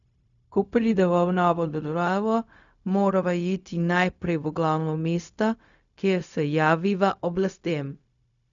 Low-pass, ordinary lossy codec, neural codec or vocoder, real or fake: 7.2 kHz; none; codec, 16 kHz, 0.4 kbps, LongCat-Audio-Codec; fake